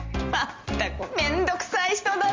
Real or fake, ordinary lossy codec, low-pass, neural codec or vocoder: real; Opus, 32 kbps; 7.2 kHz; none